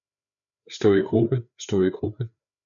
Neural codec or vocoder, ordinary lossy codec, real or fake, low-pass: codec, 16 kHz, 4 kbps, FreqCodec, larger model; MP3, 96 kbps; fake; 7.2 kHz